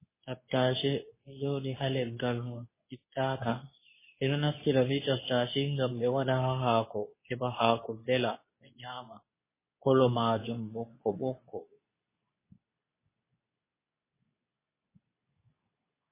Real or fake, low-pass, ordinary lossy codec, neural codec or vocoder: fake; 3.6 kHz; MP3, 16 kbps; codec, 24 kHz, 0.9 kbps, WavTokenizer, medium speech release version 2